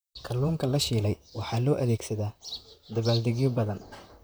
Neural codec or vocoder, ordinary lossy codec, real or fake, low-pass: vocoder, 44.1 kHz, 128 mel bands, Pupu-Vocoder; none; fake; none